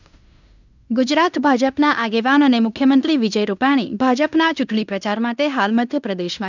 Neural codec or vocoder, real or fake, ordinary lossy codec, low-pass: codec, 16 kHz in and 24 kHz out, 0.9 kbps, LongCat-Audio-Codec, fine tuned four codebook decoder; fake; none; 7.2 kHz